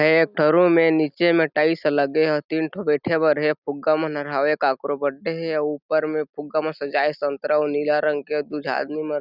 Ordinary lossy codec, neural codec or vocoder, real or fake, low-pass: none; none; real; 5.4 kHz